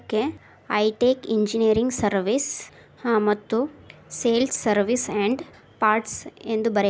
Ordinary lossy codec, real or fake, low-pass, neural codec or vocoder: none; real; none; none